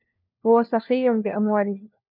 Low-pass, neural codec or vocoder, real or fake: 5.4 kHz; codec, 16 kHz, 1 kbps, FunCodec, trained on LibriTTS, 50 frames a second; fake